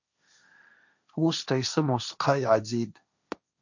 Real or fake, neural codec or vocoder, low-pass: fake; codec, 16 kHz, 1.1 kbps, Voila-Tokenizer; 7.2 kHz